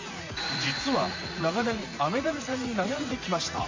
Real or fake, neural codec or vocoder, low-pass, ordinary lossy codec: fake; vocoder, 44.1 kHz, 80 mel bands, Vocos; 7.2 kHz; MP3, 32 kbps